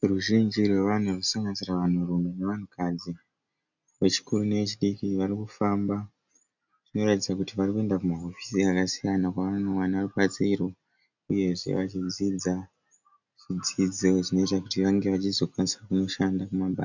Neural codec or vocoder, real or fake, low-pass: none; real; 7.2 kHz